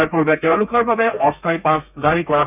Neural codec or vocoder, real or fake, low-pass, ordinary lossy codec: codec, 32 kHz, 1.9 kbps, SNAC; fake; 3.6 kHz; none